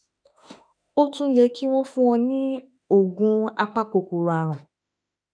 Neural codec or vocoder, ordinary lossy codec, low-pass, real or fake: autoencoder, 48 kHz, 32 numbers a frame, DAC-VAE, trained on Japanese speech; none; 9.9 kHz; fake